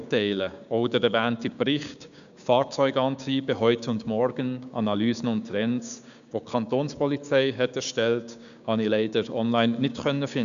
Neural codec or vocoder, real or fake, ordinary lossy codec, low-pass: codec, 16 kHz, 6 kbps, DAC; fake; none; 7.2 kHz